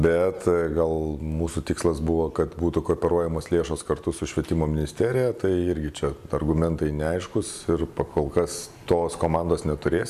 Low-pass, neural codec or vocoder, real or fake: 14.4 kHz; none; real